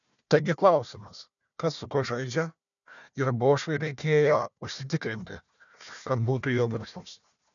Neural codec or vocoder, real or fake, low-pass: codec, 16 kHz, 1 kbps, FunCodec, trained on Chinese and English, 50 frames a second; fake; 7.2 kHz